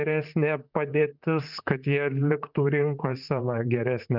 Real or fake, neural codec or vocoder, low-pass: fake; vocoder, 44.1 kHz, 128 mel bands, Pupu-Vocoder; 5.4 kHz